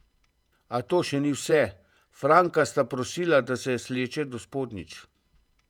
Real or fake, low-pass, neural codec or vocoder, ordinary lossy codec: fake; 19.8 kHz; vocoder, 48 kHz, 128 mel bands, Vocos; none